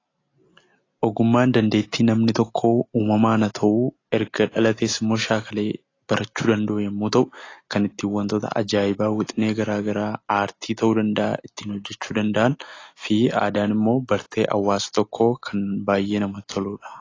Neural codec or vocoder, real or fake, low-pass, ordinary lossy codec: none; real; 7.2 kHz; AAC, 32 kbps